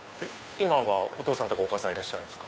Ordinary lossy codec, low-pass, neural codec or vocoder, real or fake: none; none; codec, 16 kHz, 2 kbps, FunCodec, trained on Chinese and English, 25 frames a second; fake